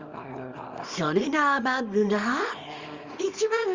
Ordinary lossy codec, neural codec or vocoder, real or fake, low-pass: Opus, 32 kbps; codec, 24 kHz, 0.9 kbps, WavTokenizer, small release; fake; 7.2 kHz